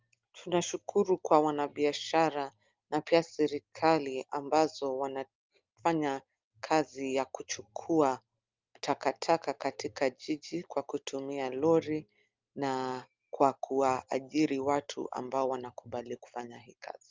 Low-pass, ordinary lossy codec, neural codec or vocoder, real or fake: 7.2 kHz; Opus, 32 kbps; none; real